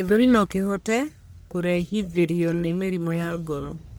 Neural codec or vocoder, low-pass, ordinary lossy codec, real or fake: codec, 44.1 kHz, 1.7 kbps, Pupu-Codec; none; none; fake